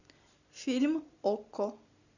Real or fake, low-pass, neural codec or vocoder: real; 7.2 kHz; none